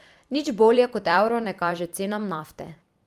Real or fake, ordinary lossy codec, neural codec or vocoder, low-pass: fake; Opus, 32 kbps; vocoder, 44.1 kHz, 128 mel bands every 256 samples, BigVGAN v2; 14.4 kHz